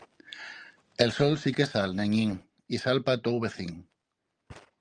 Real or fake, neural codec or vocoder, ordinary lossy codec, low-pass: real; none; Opus, 32 kbps; 9.9 kHz